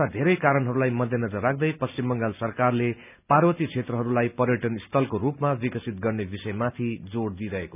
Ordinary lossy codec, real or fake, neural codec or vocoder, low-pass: none; real; none; 3.6 kHz